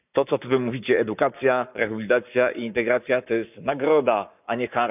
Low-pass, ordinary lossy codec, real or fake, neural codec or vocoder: 3.6 kHz; none; fake; codec, 44.1 kHz, 7.8 kbps, Pupu-Codec